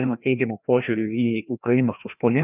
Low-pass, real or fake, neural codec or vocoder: 3.6 kHz; fake; codec, 16 kHz, 1 kbps, FreqCodec, larger model